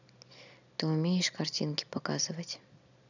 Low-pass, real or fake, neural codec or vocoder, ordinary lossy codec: 7.2 kHz; real; none; none